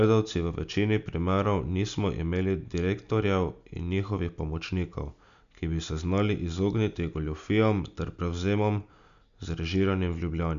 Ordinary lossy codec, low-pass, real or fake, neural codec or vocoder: none; 7.2 kHz; real; none